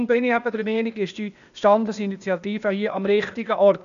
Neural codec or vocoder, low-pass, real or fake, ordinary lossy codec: codec, 16 kHz, 0.8 kbps, ZipCodec; 7.2 kHz; fake; none